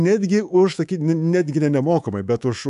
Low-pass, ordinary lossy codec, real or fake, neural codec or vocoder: 10.8 kHz; AAC, 96 kbps; fake; codec, 24 kHz, 3.1 kbps, DualCodec